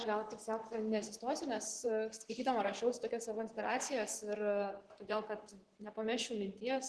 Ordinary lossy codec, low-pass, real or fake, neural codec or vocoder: Opus, 16 kbps; 10.8 kHz; fake; vocoder, 44.1 kHz, 128 mel bands, Pupu-Vocoder